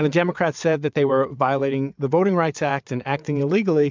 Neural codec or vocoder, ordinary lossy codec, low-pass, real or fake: vocoder, 22.05 kHz, 80 mel bands, WaveNeXt; MP3, 64 kbps; 7.2 kHz; fake